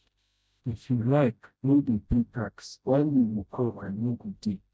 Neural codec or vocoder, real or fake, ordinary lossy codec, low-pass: codec, 16 kHz, 0.5 kbps, FreqCodec, smaller model; fake; none; none